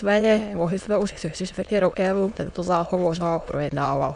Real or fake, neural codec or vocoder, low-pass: fake; autoencoder, 22.05 kHz, a latent of 192 numbers a frame, VITS, trained on many speakers; 9.9 kHz